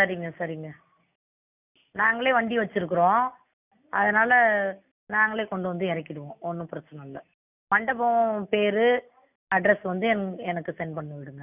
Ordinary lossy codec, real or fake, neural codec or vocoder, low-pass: none; real; none; 3.6 kHz